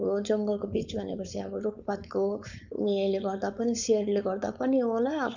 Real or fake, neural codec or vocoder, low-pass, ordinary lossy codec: fake; codec, 16 kHz, 4.8 kbps, FACodec; 7.2 kHz; none